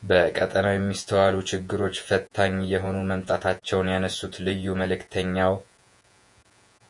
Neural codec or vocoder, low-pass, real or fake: vocoder, 48 kHz, 128 mel bands, Vocos; 10.8 kHz; fake